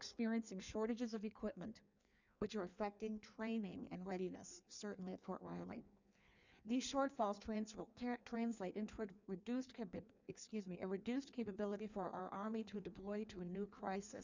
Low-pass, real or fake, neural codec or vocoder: 7.2 kHz; fake; codec, 16 kHz in and 24 kHz out, 1.1 kbps, FireRedTTS-2 codec